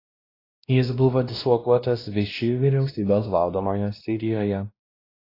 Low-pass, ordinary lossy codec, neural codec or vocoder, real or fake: 5.4 kHz; AAC, 24 kbps; codec, 16 kHz, 1 kbps, X-Codec, WavLM features, trained on Multilingual LibriSpeech; fake